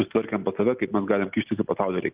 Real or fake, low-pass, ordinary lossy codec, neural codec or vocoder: real; 3.6 kHz; Opus, 32 kbps; none